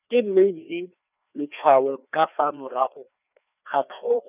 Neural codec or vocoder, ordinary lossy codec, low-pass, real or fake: codec, 16 kHz, 2 kbps, FreqCodec, larger model; none; 3.6 kHz; fake